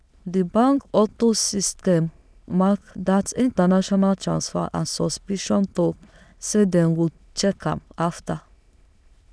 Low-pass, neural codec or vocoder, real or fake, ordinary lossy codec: none; autoencoder, 22.05 kHz, a latent of 192 numbers a frame, VITS, trained on many speakers; fake; none